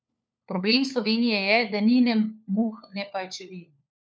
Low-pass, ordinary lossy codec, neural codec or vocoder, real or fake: none; none; codec, 16 kHz, 4 kbps, FunCodec, trained on LibriTTS, 50 frames a second; fake